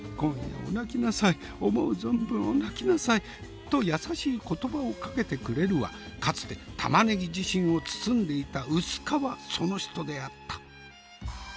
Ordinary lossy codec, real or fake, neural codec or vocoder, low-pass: none; real; none; none